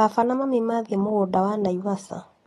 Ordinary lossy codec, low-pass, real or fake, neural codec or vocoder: AAC, 32 kbps; 14.4 kHz; real; none